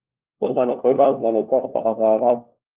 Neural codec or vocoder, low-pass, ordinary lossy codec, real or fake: codec, 16 kHz, 1 kbps, FunCodec, trained on LibriTTS, 50 frames a second; 3.6 kHz; Opus, 32 kbps; fake